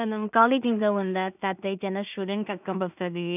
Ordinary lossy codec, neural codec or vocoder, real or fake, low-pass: none; codec, 16 kHz in and 24 kHz out, 0.4 kbps, LongCat-Audio-Codec, two codebook decoder; fake; 3.6 kHz